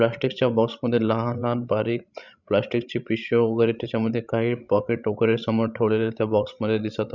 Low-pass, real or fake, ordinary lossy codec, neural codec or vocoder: 7.2 kHz; fake; none; codec, 16 kHz, 16 kbps, FreqCodec, larger model